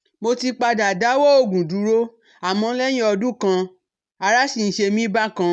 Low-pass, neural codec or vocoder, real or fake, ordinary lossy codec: none; none; real; none